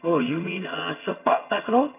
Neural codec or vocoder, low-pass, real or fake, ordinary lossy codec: vocoder, 22.05 kHz, 80 mel bands, HiFi-GAN; 3.6 kHz; fake; none